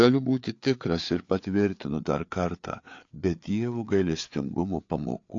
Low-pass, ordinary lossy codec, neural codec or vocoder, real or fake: 7.2 kHz; AAC, 48 kbps; codec, 16 kHz, 4 kbps, FreqCodec, larger model; fake